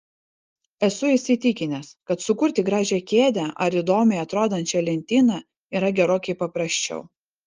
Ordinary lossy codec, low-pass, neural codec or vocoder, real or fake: Opus, 24 kbps; 7.2 kHz; none; real